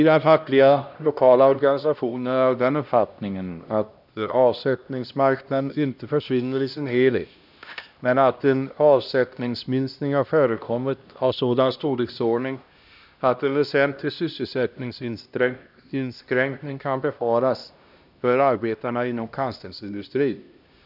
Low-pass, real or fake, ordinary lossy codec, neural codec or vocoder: 5.4 kHz; fake; none; codec, 16 kHz, 1 kbps, X-Codec, HuBERT features, trained on LibriSpeech